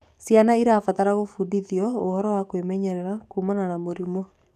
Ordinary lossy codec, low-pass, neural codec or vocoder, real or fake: none; 14.4 kHz; codec, 44.1 kHz, 7.8 kbps, DAC; fake